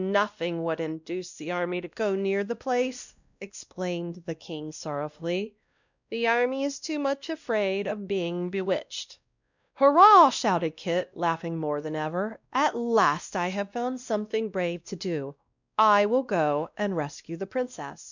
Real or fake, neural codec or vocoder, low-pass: fake; codec, 16 kHz, 1 kbps, X-Codec, WavLM features, trained on Multilingual LibriSpeech; 7.2 kHz